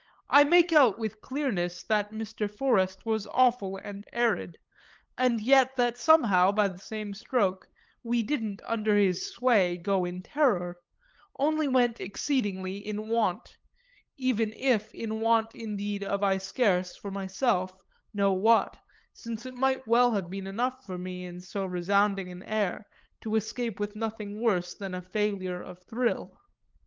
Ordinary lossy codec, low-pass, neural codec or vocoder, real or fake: Opus, 32 kbps; 7.2 kHz; codec, 16 kHz, 8 kbps, FunCodec, trained on LibriTTS, 25 frames a second; fake